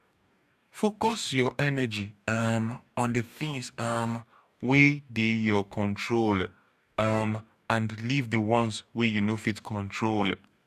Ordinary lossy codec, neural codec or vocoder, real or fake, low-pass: none; codec, 44.1 kHz, 2.6 kbps, DAC; fake; 14.4 kHz